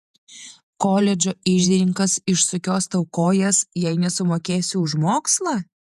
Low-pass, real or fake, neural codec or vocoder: 14.4 kHz; fake; vocoder, 48 kHz, 128 mel bands, Vocos